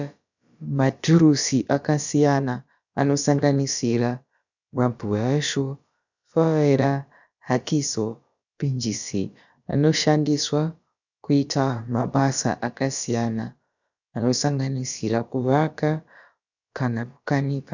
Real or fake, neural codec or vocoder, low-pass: fake; codec, 16 kHz, about 1 kbps, DyCAST, with the encoder's durations; 7.2 kHz